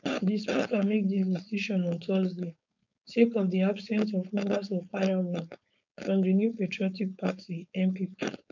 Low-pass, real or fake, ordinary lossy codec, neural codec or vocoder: 7.2 kHz; fake; none; codec, 16 kHz, 4.8 kbps, FACodec